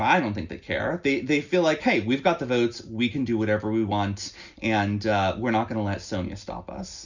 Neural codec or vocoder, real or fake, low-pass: none; real; 7.2 kHz